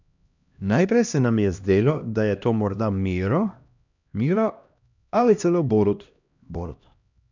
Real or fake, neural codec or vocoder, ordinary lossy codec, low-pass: fake; codec, 16 kHz, 1 kbps, X-Codec, HuBERT features, trained on LibriSpeech; none; 7.2 kHz